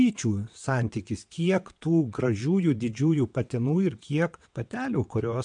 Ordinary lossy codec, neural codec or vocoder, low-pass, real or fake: MP3, 64 kbps; vocoder, 22.05 kHz, 80 mel bands, WaveNeXt; 9.9 kHz; fake